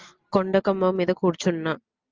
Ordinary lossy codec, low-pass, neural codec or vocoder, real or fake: Opus, 24 kbps; 7.2 kHz; none; real